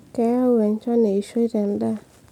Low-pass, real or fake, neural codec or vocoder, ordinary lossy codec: 19.8 kHz; real; none; MP3, 96 kbps